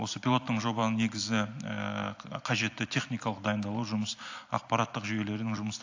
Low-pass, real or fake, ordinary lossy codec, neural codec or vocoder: 7.2 kHz; real; AAC, 48 kbps; none